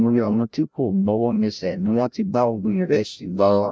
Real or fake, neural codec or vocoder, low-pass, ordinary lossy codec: fake; codec, 16 kHz, 0.5 kbps, FreqCodec, larger model; none; none